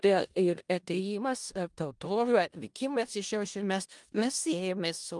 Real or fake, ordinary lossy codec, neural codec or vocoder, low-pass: fake; Opus, 32 kbps; codec, 16 kHz in and 24 kHz out, 0.4 kbps, LongCat-Audio-Codec, four codebook decoder; 10.8 kHz